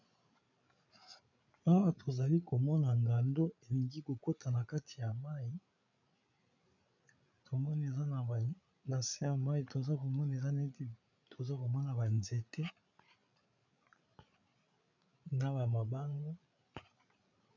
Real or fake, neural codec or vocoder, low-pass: fake; codec, 16 kHz, 8 kbps, FreqCodec, larger model; 7.2 kHz